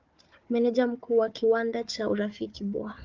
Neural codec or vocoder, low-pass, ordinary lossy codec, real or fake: codec, 16 kHz, 4 kbps, FunCodec, trained on Chinese and English, 50 frames a second; 7.2 kHz; Opus, 24 kbps; fake